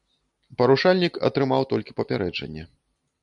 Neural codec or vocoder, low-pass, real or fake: none; 10.8 kHz; real